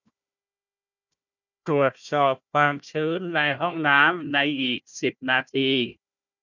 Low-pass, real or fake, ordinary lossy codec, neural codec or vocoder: 7.2 kHz; fake; none; codec, 16 kHz, 1 kbps, FunCodec, trained on Chinese and English, 50 frames a second